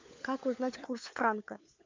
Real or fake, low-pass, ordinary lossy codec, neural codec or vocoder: fake; 7.2 kHz; MP3, 64 kbps; codec, 16 kHz, 4 kbps, FunCodec, trained on LibriTTS, 50 frames a second